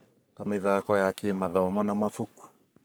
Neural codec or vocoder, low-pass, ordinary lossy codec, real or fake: codec, 44.1 kHz, 3.4 kbps, Pupu-Codec; none; none; fake